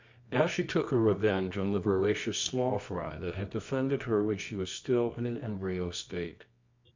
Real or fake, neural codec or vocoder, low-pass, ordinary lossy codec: fake; codec, 24 kHz, 0.9 kbps, WavTokenizer, medium music audio release; 7.2 kHz; MP3, 64 kbps